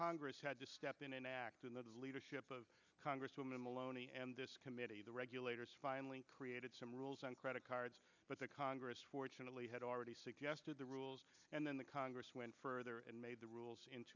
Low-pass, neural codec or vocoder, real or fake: 7.2 kHz; none; real